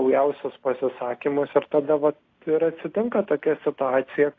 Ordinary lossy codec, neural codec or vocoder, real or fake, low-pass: AAC, 32 kbps; none; real; 7.2 kHz